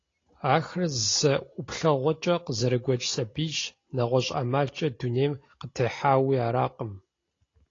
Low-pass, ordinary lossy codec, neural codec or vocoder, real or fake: 7.2 kHz; AAC, 32 kbps; none; real